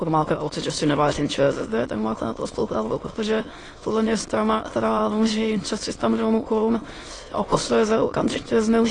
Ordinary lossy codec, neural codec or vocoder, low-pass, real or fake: AAC, 32 kbps; autoencoder, 22.05 kHz, a latent of 192 numbers a frame, VITS, trained on many speakers; 9.9 kHz; fake